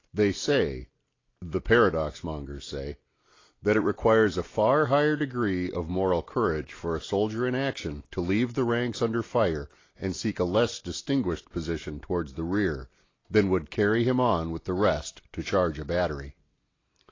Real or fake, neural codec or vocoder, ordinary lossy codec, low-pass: real; none; AAC, 32 kbps; 7.2 kHz